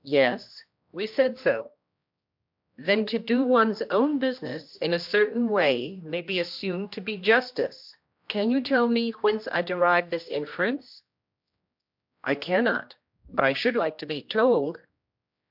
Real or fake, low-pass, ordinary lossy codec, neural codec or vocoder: fake; 5.4 kHz; MP3, 48 kbps; codec, 16 kHz, 1 kbps, X-Codec, HuBERT features, trained on general audio